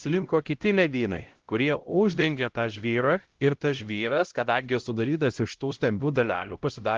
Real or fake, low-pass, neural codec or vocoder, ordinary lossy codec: fake; 7.2 kHz; codec, 16 kHz, 0.5 kbps, X-Codec, HuBERT features, trained on LibriSpeech; Opus, 16 kbps